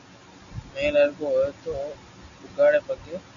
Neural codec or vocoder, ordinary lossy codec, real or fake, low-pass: none; MP3, 96 kbps; real; 7.2 kHz